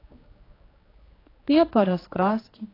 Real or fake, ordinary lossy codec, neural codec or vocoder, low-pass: fake; AAC, 24 kbps; codec, 16 kHz, 2 kbps, X-Codec, HuBERT features, trained on general audio; 5.4 kHz